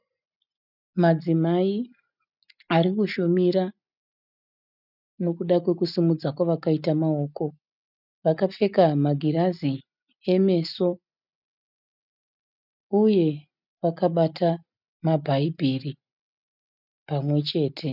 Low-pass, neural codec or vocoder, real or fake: 5.4 kHz; none; real